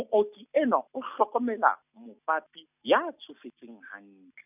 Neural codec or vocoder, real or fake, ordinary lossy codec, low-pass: none; real; none; 3.6 kHz